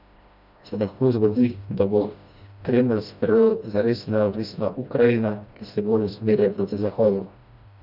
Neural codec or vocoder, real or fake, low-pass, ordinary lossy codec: codec, 16 kHz, 1 kbps, FreqCodec, smaller model; fake; 5.4 kHz; none